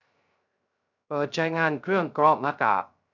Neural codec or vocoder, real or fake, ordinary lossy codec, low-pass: codec, 16 kHz, 0.3 kbps, FocalCodec; fake; none; 7.2 kHz